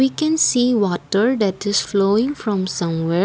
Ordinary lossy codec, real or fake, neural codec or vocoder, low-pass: none; real; none; none